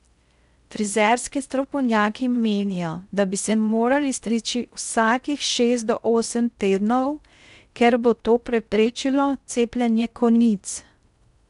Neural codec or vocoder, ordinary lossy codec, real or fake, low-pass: codec, 16 kHz in and 24 kHz out, 0.6 kbps, FocalCodec, streaming, 4096 codes; none; fake; 10.8 kHz